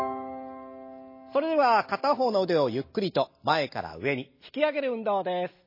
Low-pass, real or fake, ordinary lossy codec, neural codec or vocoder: 5.4 kHz; real; MP3, 24 kbps; none